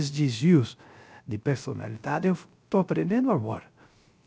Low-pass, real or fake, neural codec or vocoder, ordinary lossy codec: none; fake; codec, 16 kHz, 0.3 kbps, FocalCodec; none